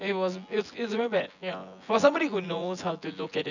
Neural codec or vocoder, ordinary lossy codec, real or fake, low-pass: vocoder, 24 kHz, 100 mel bands, Vocos; none; fake; 7.2 kHz